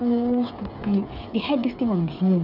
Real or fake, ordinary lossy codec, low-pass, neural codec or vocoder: fake; none; 5.4 kHz; codec, 16 kHz, 8 kbps, FreqCodec, smaller model